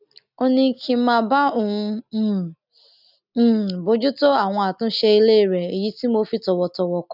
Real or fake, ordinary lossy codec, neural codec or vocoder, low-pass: real; none; none; 5.4 kHz